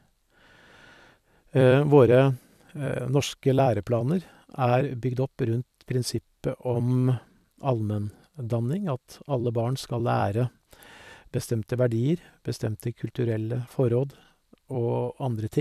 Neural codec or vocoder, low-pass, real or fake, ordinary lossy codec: vocoder, 44.1 kHz, 128 mel bands every 256 samples, BigVGAN v2; 14.4 kHz; fake; none